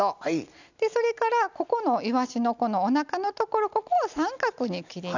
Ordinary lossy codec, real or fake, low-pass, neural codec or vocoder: none; real; 7.2 kHz; none